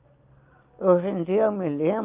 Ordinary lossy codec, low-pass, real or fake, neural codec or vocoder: Opus, 32 kbps; 3.6 kHz; real; none